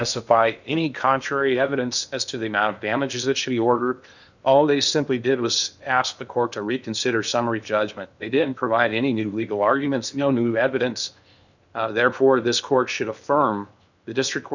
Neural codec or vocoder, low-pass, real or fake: codec, 16 kHz in and 24 kHz out, 0.8 kbps, FocalCodec, streaming, 65536 codes; 7.2 kHz; fake